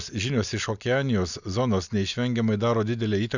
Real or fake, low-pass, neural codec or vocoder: real; 7.2 kHz; none